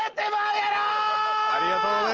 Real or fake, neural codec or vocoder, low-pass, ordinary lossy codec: real; none; 7.2 kHz; Opus, 16 kbps